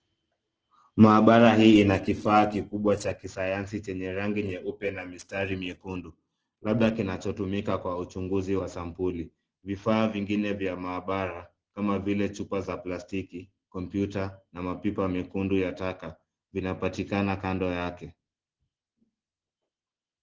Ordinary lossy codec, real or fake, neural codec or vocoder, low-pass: Opus, 16 kbps; real; none; 7.2 kHz